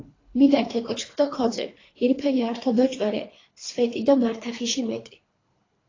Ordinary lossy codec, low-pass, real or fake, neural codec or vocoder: AAC, 32 kbps; 7.2 kHz; fake; codec, 24 kHz, 3 kbps, HILCodec